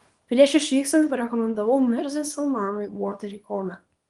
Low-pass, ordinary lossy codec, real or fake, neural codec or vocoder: 10.8 kHz; Opus, 24 kbps; fake; codec, 24 kHz, 0.9 kbps, WavTokenizer, small release